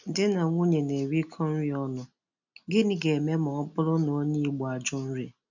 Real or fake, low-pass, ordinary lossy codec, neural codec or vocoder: real; 7.2 kHz; none; none